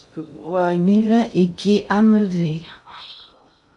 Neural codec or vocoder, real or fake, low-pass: codec, 16 kHz in and 24 kHz out, 0.6 kbps, FocalCodec, streaming, 2048 codes; fake; 10.8 kHz